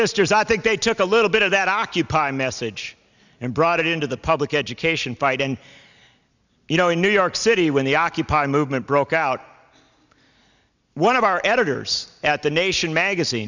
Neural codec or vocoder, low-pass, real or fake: none; 7.2 kHz; real